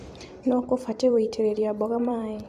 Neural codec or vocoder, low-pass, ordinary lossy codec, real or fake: vocoder, 44.1 kHz, 128 mel bands every 256 samples, BigVGAN v2; 14.4 kHz; AAC, 96 kbps; fake